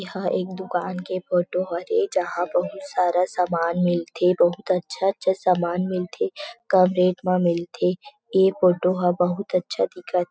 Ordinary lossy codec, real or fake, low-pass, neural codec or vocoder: none; real; none; none